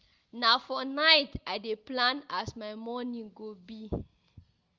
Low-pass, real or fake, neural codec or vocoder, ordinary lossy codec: 7.2 kHz; real; none; Opus, 32 kbps